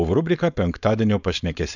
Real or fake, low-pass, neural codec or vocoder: fake; 7.2 kHz; codec, 16 kHz, 4.8 kbps, FACodec